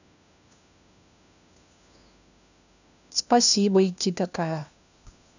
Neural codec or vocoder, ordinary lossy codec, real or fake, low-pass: codec, 16 kHz, 1 kbps, FunCodec, trained on LibriTTS, 50 frames a second; none; fake; 7.2 kHz